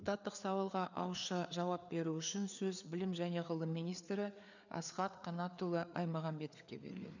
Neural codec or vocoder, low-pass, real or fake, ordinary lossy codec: codec, 16 kHz, 4 kbps, FreqCodec, larger model; 7.2 kHz; fake; none